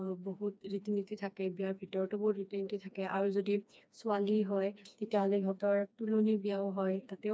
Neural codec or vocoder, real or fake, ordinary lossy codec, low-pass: codec, 16 kHz, 2 kbps, FreqCodec, smaller model; fake; none; none